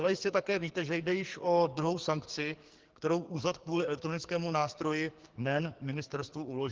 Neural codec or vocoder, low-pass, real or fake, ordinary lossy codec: codec, 44.1 kHz, 2.6 kbps, SNAC; 7.2 kHz; fake; Opus, 16 kbps